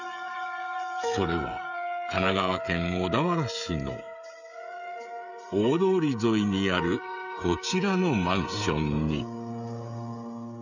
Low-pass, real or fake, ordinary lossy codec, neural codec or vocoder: 7.2 kHz; fake; none; codec, 16 kHz, 16 kbps, FreqCodec, smaller model